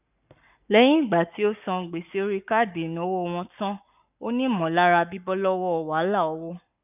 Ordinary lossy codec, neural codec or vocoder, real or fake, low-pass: none; codec, 44.1 kHz, 7.8 kbps, Pupu-Codec; fake; 3.6 kHz